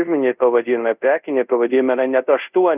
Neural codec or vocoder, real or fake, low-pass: codec, 24 kHz, 0.5 kbps, DualCodec; fake; 3.6 kHz